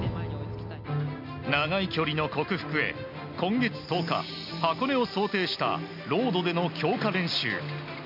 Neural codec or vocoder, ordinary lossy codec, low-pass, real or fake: none; MP3, 48 kbps; 5.4 kHz; real